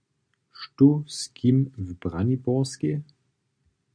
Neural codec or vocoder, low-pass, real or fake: none; 9.9 kHz; real